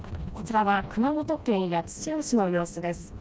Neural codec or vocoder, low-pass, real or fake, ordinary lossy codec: codec, 16 kHz, 1 kbps, FreqCodec, smaller model; none; fake; none